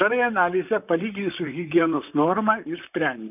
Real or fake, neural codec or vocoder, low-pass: fake; vocoder, 44.1 kHz, 128 mel bands, Pupu-Vocoder; 3.6 kHz